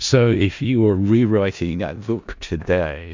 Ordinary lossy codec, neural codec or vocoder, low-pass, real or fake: MP3, 64 kbps; codec, 16 kHz in and 24 kHz out, 0.4 kbps, LongCat-Audio-Codec, four codebook decoder; 7.2 kHz; fake